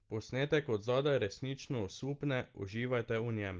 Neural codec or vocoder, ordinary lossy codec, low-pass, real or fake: none; Opus, 16 kbps; 7.2 kHz; real